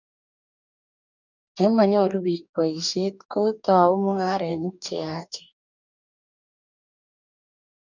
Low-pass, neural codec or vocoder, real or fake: 7.2 kHz; codec, 44.1 kHz, 2.6 kbps, DAC; fake